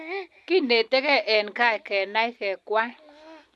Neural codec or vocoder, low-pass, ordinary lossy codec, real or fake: none; none; none; real